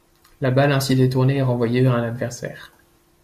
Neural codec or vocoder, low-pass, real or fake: none; 14.4 kHz; real